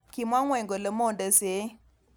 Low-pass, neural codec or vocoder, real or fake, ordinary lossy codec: none; none; real; none